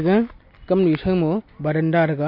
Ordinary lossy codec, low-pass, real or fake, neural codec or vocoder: MP3, 32 kbps; 5.4 kHz; real; none